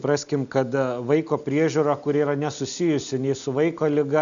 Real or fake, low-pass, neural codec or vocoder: real; 7.2 kHz; none